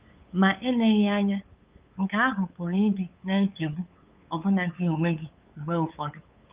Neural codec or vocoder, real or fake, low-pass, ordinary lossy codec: codec, 16 kHz, 8 kbps, FunCodec, trained on LibriTTS, 25 frames a second; fake; 3.6 kHz; Opus, 32 kbps